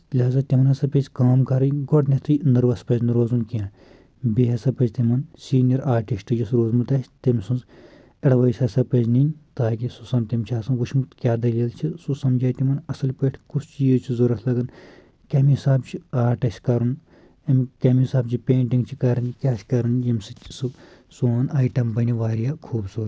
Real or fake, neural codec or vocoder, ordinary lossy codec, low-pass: real; none; none; none